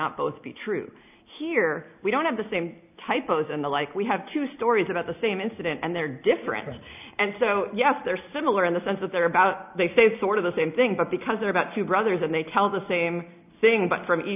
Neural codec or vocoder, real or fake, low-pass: none; real; 3.6 kHz